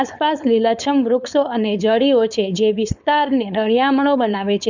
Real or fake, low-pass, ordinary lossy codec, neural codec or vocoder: fake; 7.2 kHz; none; codec, 16 kHz, 4.8 kbps, FACodec